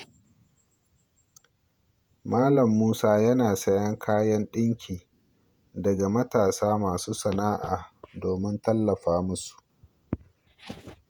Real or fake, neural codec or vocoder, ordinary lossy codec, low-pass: fake; vocoder, 48 kHz, 128 mel bands, Vocos; none; 19.8 kHz